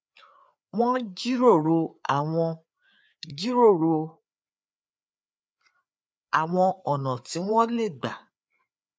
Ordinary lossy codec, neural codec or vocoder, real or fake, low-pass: none; codec, 16 kHz, 4 kbps, FreqCodec, larger model; fake; none